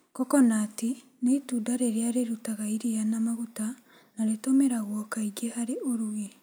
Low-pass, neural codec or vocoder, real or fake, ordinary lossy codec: none; none; real; none